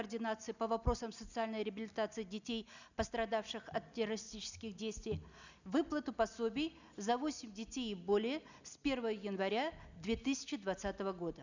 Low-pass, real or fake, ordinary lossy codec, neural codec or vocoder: 7.2 kHz; real; none; none